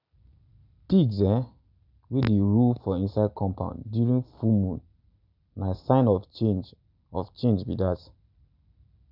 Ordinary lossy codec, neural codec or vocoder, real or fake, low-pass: none; none; real; 5.4 kHz